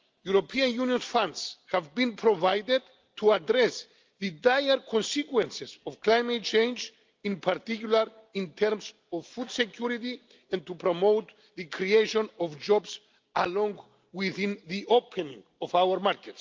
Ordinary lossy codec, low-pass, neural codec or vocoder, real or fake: Opus, 24 kbps; 7.2 kHz; none; real